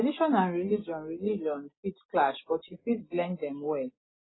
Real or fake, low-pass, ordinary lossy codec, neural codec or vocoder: real; 7.2 kHz; AAC, 16 kbps; none